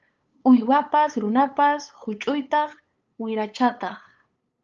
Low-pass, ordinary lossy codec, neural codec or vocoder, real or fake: 7.2 kHz; Opus, 24 kbps; codec, 16 kHz, 8 kbps, FunCodec, trained on LibriTTS, 25 frames a second; fake